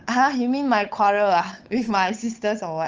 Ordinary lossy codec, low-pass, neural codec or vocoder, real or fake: Opus, 24 kbps; 7.2 kHz; codec, 16 kHz, 8 kbps, FunCodec, trained on Chinese and English, 25 frames a second; fake